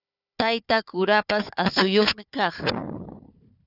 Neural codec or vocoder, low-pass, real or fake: codec, 16 kHz, 4 kbps, FunCodec, trained on Chinese and English, 50 frames a second; 5.4 kHz; fake